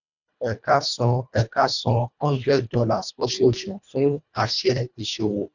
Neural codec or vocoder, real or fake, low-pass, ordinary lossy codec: codec, 24 kHz, 1.5 kbps, HILCodec; fake; 7.2 kHz; none